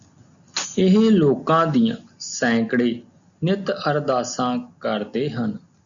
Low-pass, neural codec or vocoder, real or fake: 7.2 kHz; none; real